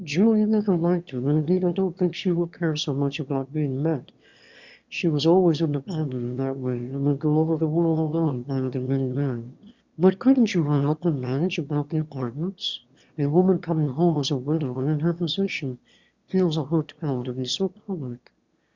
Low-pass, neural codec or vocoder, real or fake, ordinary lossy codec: 7.2 kHz; autoencoder, 22.05 kHz, a latent of 192 numbers a frame, VITS, trained on one speaker; fake; Opus, 64 kbps